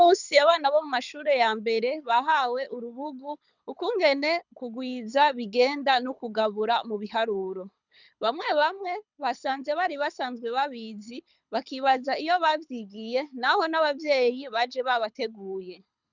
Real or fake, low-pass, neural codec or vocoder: fake; 7.2 kHz; codec, 24 kHz, 6 kbps, HILCodec